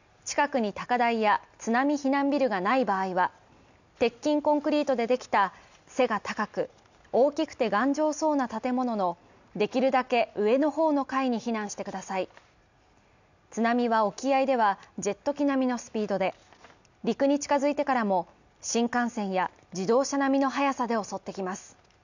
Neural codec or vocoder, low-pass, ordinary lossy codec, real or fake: none; 7.2 kHz; none; real